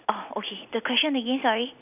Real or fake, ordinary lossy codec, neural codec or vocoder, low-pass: real; none; none; 3.6 kHz